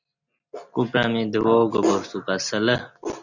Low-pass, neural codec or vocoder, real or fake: 7.2 kHz; none; real